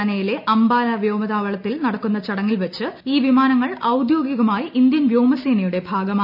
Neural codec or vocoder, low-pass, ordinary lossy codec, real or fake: none; 5.4 kHz; AAC, 48 kbps; real